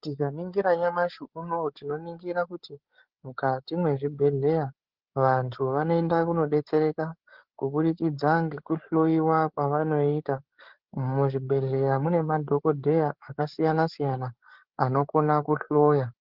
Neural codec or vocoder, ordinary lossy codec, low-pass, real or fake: codec, 16 kHz, 8 kbps, FreqCodec, larger model; Opus, 16 kbps; 5.4 kHz; fake